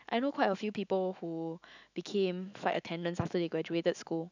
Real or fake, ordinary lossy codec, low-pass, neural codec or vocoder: fake; none; 7.2 kHz; autoencoder, 48 kHz, 128 numbers a frame, DAC-VAE, trained on Japanese speech